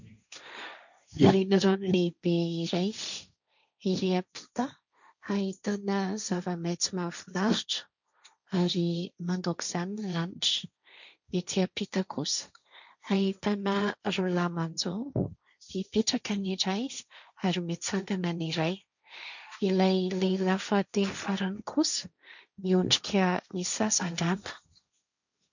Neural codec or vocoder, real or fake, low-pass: codec, 16 kHz, 1.1 kbps, Voila-Tokenizer; fake; 7.2 kHz